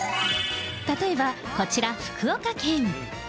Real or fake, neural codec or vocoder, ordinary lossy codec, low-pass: real; none; none; none